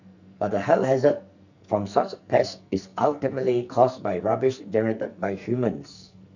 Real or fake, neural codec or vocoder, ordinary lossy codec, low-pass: fake; codec, 44.1 kHz, 2.6 kbps, SNAC; none; 7.2 kHz